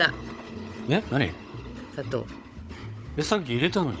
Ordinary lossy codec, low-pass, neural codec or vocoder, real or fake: none; none; codec, 16 kHz, 16 kbps, FunCodec, trained on Chinese and English, 50 frames a second; fake